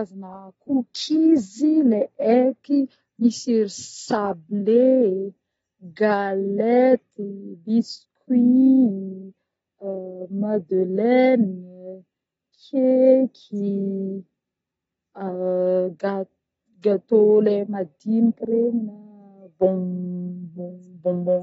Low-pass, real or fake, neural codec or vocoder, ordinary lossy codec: 19.8 kHz; real; none; AAC, 24 kbps